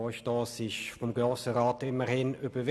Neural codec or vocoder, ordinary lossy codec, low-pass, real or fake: none; none; none; real